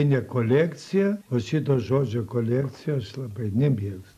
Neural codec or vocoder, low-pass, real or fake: none; 14.4 kHz; real